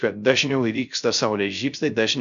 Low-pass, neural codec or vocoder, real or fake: 7.2 kHz; codec, 16 kHz, 0.3 kbps, FocalCodec; fake